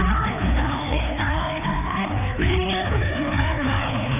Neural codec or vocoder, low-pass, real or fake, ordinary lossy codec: codec, 16 kHz, 2 kbps, FreqCodec, larger model; 3.6 kHz; fake; none